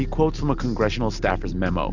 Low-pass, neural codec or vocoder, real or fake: 7.2 kHz; none; real